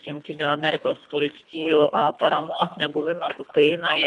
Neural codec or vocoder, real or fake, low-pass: codec, 24 kHz, 1.5 kbps, HILCodec; fake; 10.8 kHz